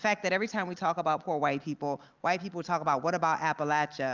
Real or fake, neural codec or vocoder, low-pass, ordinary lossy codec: real; none; 7.2 kHz; Opus, 24 kbps